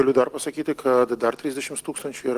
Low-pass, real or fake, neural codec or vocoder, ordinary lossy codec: 14.4 kHz; real; none; Opus, 24 kbps